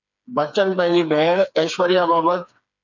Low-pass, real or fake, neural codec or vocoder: 7.2 kHz; fake; codec, 16 kHz, 4 kbps, FreqCodec, smaller model